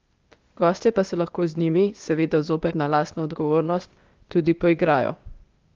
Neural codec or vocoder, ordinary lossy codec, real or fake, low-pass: codec, 16 kHz, 0.8 kbps, ZipCodec; Opus, 32 kbps; fake; 7.2 kHz